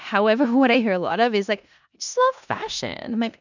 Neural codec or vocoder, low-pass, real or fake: codec, 16 kHz in and 24 kHz out, 0.9 kbps, LongCat-Audio-Codec, four codebook decoder; 7.2 kHz; fake